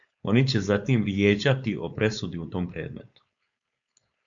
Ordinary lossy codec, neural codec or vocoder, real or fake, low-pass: MP3, 64 kbps; codec, 16 kHz, 4.8 kbps, FACodec; fake; 7.2 kHz